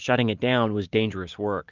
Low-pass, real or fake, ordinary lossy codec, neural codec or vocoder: 7.2 kHz; real; Opus, 32 kbps; none